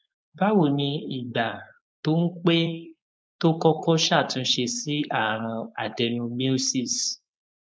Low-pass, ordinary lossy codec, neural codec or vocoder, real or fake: none; none; codec, 16 kHz, 4.8 kbps, FACodec; fake